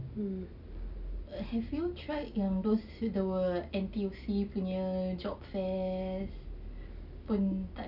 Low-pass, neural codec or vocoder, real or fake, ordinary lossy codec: 5.4 kHz; none; real; none